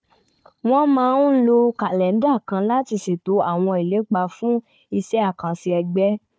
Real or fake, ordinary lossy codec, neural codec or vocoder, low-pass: fake; none; codec, 16 kHz, 4 kbps, FunCodec, trained on Chinese and English, 50 frames a second; none